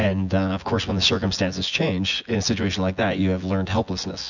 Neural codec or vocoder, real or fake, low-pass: vocoder, 24 kHz, 100 mel bands, Vocos; fake; 7.2 kHz